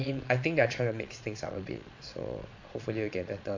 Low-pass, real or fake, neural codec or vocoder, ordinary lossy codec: 7.2 kHz; fake; vocoder, 22.05 kHz, 80 mel bands, Vocos; MP3, 48 kbps